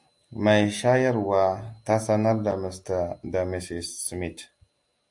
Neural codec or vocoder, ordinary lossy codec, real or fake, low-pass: none; AAC, 64 kbps; real; 10.8 kHz